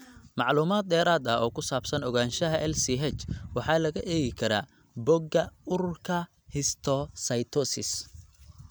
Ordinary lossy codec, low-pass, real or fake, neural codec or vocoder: none; none; real; none